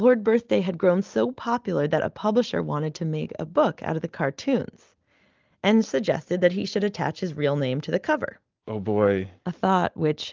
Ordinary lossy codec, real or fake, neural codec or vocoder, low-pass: Opus, 32 kbps; real; none; 7.2 kHz